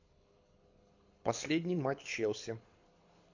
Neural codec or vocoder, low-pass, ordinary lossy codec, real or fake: codec, 24 kHz, 6 kbps, HILCodec; 7.2 kHz; MP3, 48 kbps; fake